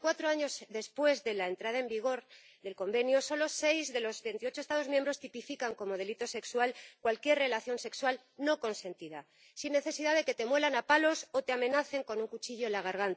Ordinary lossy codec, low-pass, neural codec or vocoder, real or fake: none; none; none; real